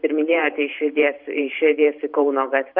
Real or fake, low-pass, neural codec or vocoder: fake; 5.4 kHz; vocoder, 44.1 kHz, 128 mel bands every 512 samples, BigVGAN v2